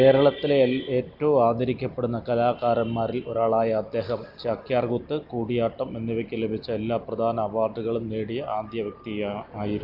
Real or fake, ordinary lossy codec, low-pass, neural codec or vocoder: real; Opus, 32 kbps; 5.4 kHz; none